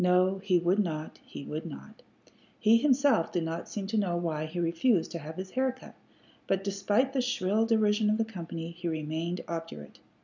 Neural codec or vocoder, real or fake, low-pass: none; real; 7.2 kHz